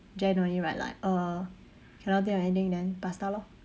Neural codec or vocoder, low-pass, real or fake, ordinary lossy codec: none; none; real; none